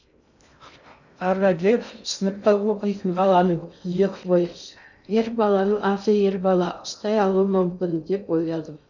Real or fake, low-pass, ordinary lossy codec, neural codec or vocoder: fake; 7.2 kHz; none; codec, 16 kHz in and 24 kHz out, 0.6 kbps, FocalCodec, streaming, 2048 codes